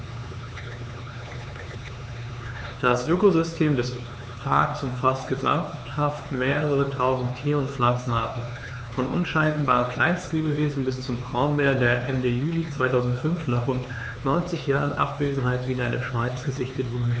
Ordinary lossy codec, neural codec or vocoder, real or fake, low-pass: none; codec, 16 kHz, 4 kbps, X-Codec, HuBERT features, trained on LibriSpeech; fake; none